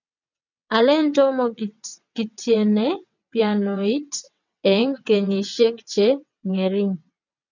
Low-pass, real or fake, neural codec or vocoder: 7.2 kHz; fake; vocoder, 22.05 kHz, 80 mel bands, WaveNeXt